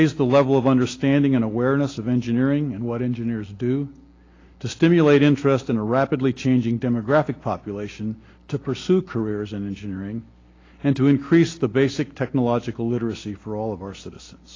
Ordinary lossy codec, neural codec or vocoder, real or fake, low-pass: AAC, 32 kbps; none; real; 7.2 kHz